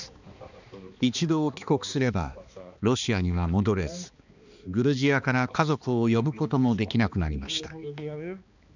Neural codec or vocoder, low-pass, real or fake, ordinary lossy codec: codec, 16 kHz, 2 kbps, X-Codec, HuBERT features, trained on balanced general audio; 7.2 kHz; fake; none